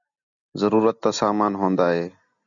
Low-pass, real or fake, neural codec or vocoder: 7.2 kHz; real; none